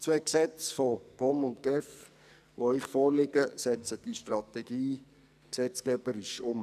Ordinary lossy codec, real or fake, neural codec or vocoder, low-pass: none; fake; codec, 44.1 kHz, 2.6 kbps, SNAC; 14.4 kHz